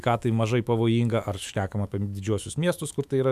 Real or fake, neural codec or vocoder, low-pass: fake; autoencoder, 48 kHz, 128 numbers a frame, DAC-VAE, trained on Japanese speech; 14.4 kHz